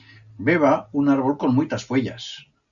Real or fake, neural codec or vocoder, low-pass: real; none; 7.2 kHz